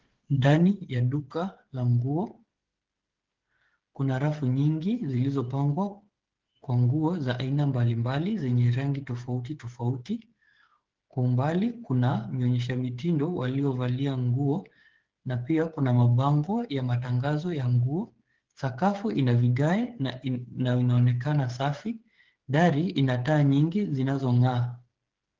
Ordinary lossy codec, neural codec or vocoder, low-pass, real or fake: Opus, 16 kbps; codec, 16 kHz, 8 kbps, FreqCodec, smaller model; 7.2 kHz; fake